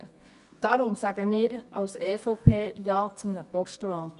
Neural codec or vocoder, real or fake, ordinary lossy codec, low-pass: codec, 24 kHz, 0.9 kbps, WavTokenizer, medium music audio release; fake; none; 10.8 kHz